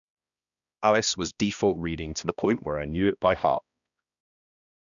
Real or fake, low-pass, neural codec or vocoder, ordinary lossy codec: fake; 7.2 kHz; codec, 16 kHz, 1 kbps, X-Codec, HuBERT features, trained on balanced general audio; none